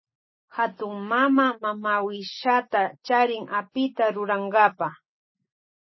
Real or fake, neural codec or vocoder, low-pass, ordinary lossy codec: real; none; 7.2 kHz; MP3, 24 kbps